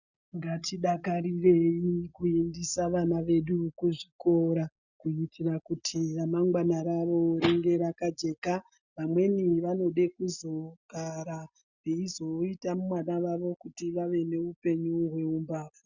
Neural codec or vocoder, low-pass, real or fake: none; 7.2 kHz; real